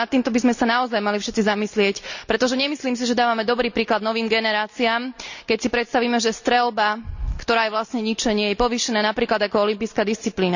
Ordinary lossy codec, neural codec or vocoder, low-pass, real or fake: none; none; 7.2 kHz; real